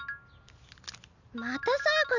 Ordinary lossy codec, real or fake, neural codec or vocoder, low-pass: none; real; none; 7.2 kHz